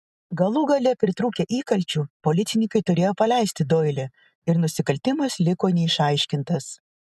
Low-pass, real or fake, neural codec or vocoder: 14.4 kHz; real; none